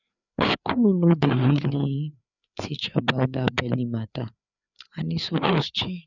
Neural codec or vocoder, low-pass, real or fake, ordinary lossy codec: codec, 16 kHz, 4 kbps, FreqCodec, larger model; 7.2 kHz; fake; none